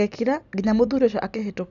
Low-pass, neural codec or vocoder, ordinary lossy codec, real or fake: 7.2 kHz; none; none; real